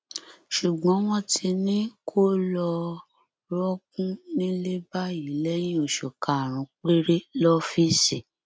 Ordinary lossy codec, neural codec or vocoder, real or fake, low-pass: none; none; real; none